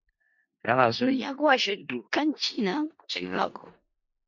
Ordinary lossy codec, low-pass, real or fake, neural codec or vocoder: MP3, 48 kbps; 7.2 kHz; fake; codec, 16 kHz in and 24 kHz out, 0.4 kbps, LongCat-Audio-Codec, four codebook decoder